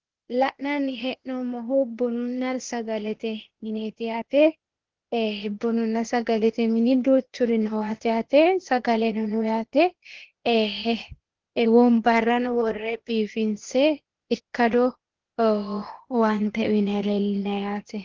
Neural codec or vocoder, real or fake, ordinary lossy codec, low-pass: codec, 16 kHz, 0.8 kbps, ZipCodec; fake; Opus, 16 kbps; 7.2 kHz